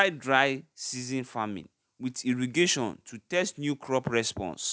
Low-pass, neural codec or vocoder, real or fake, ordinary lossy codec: none; none; real; none